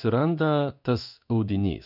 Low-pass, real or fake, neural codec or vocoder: 5.4 kHz; fake; codec, 16 kHz in and 24 kHz out, 1 kbps, XY-Tokenizer